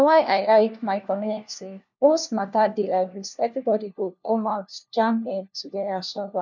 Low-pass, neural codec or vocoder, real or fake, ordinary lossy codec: 7.2 kHz; codec, 16 kHz, 1 kbps, FunCodec, trained on LibriTTS, 50 frames a second; fake; none